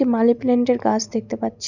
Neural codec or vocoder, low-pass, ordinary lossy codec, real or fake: none; 7.2 kHz; none; real